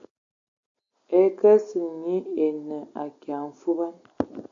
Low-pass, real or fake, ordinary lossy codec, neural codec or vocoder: 7.2 kHz; real; AAC, 64 kbps; none